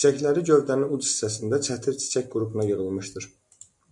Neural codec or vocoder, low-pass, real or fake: none; 10.8 kHz; real